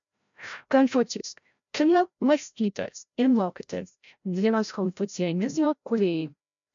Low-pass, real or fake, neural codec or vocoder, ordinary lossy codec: 7.2 kHz; fake; codec, 16 kHz, 0.5 kbps, FreqCodec, larger model; MP3, 64 kbps